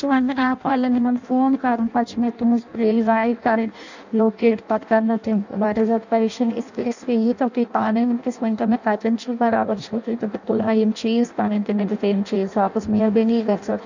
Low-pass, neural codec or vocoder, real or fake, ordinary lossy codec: 7.2 kHz; codec, 16 kHz in and 24 kHz out, 0.6 kbps, FireRedTTS-2 codec; fake; none